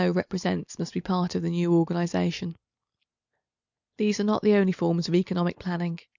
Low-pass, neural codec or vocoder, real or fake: 7.2 kHz; none; real